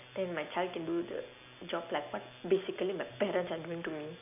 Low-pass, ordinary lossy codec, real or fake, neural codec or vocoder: 3.6 kHz; none; real; none